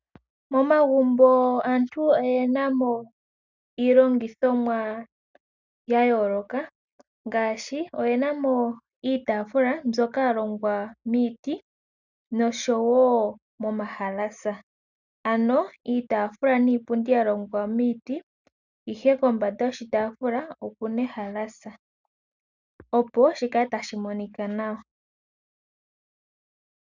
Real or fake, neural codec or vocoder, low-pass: real; none; 7.2 kHz